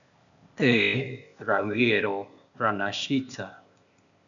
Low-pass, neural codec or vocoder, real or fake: 7.2 kHz; codec, 16 kHz, 0.8 kbps, ZipCodec; fake